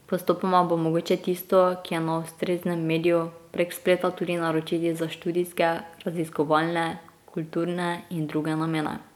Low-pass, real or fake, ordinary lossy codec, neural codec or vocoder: 19.8 kHz; real; none; none